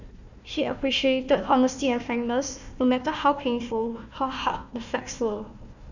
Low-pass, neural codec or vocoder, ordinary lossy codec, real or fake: 7.2 kHz; codec, 16 kHz, 1 kbps, FunCodec, trained on Chinese and English, 50 frames a second; none; fake